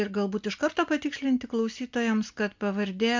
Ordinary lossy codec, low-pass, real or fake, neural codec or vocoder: MP3, 64 kbps; 7.2 kHz; real; none